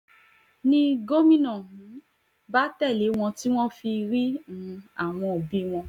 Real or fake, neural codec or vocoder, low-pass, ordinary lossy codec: real; none; 19.8 kHz; none